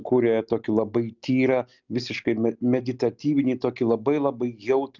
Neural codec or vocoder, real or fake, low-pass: none; real; 7.2 kHz